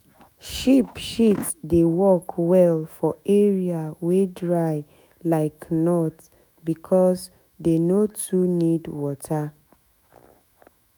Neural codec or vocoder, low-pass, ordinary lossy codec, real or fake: autoencoder, 48 kHz, 128 numbers a frame, DAC-VAE, trained on Japanese speech; none; none; fake